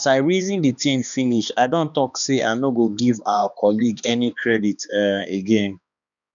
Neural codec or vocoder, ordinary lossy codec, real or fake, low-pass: codec, 16 kHz, 2 kbps, X-Codec, HuBERT features, trained on balanced general audio; none; fake; 7.2 kHz